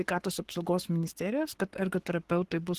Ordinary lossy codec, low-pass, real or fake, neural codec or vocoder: Opus, 16 kbps; 14.4 kHz; fake; codec, 44.1 kHz, 7.8 kbps, Pupu-Codec